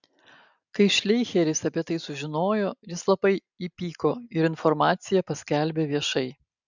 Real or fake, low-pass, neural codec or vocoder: real; 7.2 kHz; none